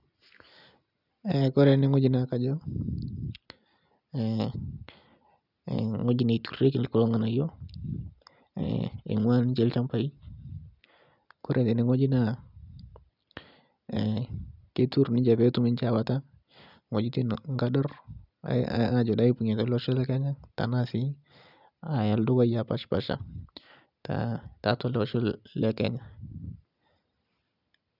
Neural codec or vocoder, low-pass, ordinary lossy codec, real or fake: none; 5.4 kHz; none; real